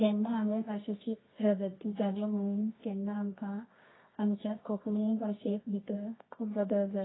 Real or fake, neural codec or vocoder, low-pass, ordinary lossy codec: fake; codec, 24 kHz, 0.9 kbps, WavTokenizer, medium music audio release; 7.2 kHz; AAC, 16 kbps